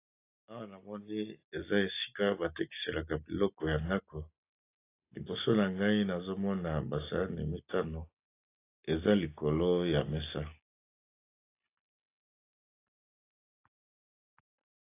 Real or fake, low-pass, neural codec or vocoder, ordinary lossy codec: real; 3.6 kHz; none; AAC, 24 kbps